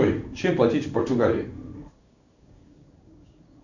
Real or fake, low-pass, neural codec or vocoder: fake; 7.2 kHz; codec, 16 kHz in and 24 kHz out, 1 kbps, XY-Tokenizer